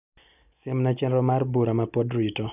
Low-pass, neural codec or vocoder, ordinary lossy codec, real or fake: 3.6 kHz; none; none; real